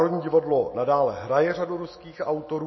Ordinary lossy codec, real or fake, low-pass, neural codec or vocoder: MP3, 24 kbps; real; 7.2 kHz; none